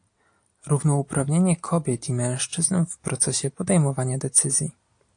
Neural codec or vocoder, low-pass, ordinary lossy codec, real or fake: none; 9.9 kHz; AAC, 64 kbps; real